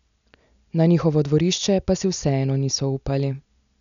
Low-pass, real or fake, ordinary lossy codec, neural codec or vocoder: 7.2 kHz; real; none; none